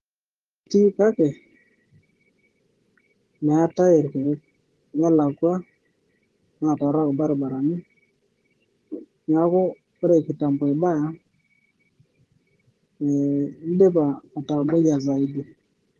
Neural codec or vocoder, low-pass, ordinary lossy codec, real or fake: vocoder, 24 kHz, 100 mel bands, Vocos; 10.8 kHz; Opus, 16 kbps; fake